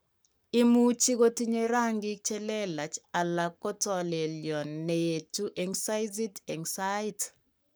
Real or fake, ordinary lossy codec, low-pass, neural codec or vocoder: fake; none; none; codec, 44.1 kHz, 7.8 kbps, Pupu-Codec